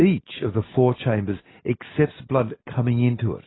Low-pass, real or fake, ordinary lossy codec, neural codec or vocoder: 7.2 kHz; real; AAC, 16 kbps; none